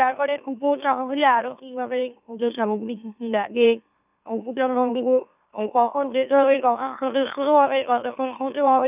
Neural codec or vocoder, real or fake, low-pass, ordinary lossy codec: autoencoder, 44.1 kHz, a latent of 192 numbers a frame, MeloTTS; fake; 3.6 kHz; none